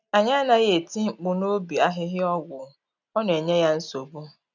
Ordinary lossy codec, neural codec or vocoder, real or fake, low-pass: none; none; real; 7.2 kHz